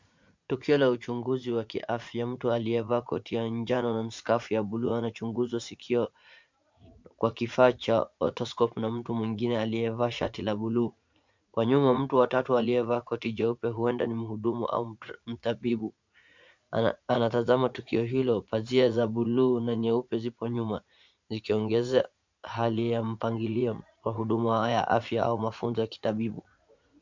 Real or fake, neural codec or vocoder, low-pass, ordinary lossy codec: fake; vocoder, 44.1 kHz, 80 mel bands, Vocos; 7.2 kHz; MP3, 64 kbps